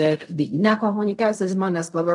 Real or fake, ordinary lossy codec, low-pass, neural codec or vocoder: fake; MP3, 96 kbps; 10.8 kHz; codec, 16 kHz in and 24 kHz out, 0.4 kbps, LongCat-Audio-Codec, fine tuned four codebook decoder